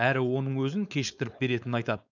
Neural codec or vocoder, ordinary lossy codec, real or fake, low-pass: codec, 16 kHz, 4.8 kbps, FACodec; none; fake; 7.2 kHz